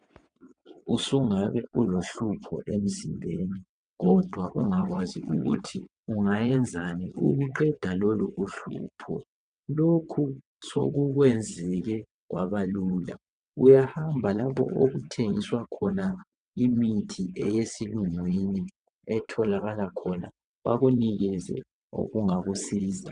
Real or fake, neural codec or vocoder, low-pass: fake; vocoder, 22.05 kHz, 80 mel bands, WaveNeXt; 9.9 kHz